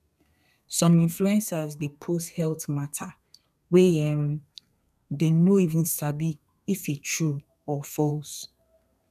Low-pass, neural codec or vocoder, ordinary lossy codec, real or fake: 14.4 kHz; codec, 32 kHz, 1.9 kbps, SNAC; none; fake